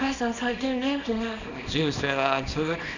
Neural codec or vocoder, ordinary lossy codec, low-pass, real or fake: codec, 24 kHz, 0.9 kbps, WavTokenizer, small release; none; 7.2 kHz; fake